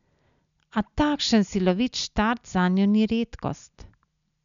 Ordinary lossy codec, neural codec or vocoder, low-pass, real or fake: MP3, 96 kbps; none; 7.2 kHz; real